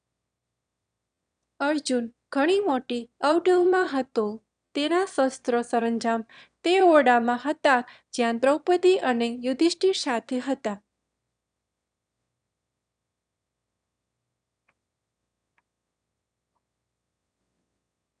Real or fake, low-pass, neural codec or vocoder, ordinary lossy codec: fake; 9.9 kHz; autoencoder, 22.05 kHz, a latent of 192 numbers a frame, VITS, trained on one speaker; none